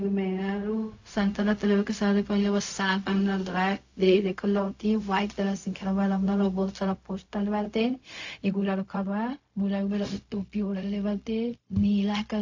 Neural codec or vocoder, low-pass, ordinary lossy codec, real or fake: codec, 16 kHz, 0.4 kbps, LongCat-Audio-Codec; 7.2 kHz; AAC, 48 kbps; fake